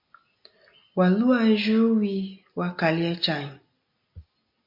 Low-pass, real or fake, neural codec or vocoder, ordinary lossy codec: 5.4 kHz; real; none; MP3, 48 kbps